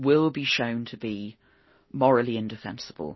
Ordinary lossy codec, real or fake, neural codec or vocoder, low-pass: MP3, 24 kbps; real; none; 7.2 kHz